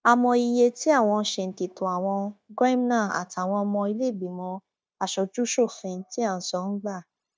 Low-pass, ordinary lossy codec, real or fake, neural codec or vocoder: none; none; fake; codec, 16 kHz, 0.9 kbps, LongCat-Audio-Codec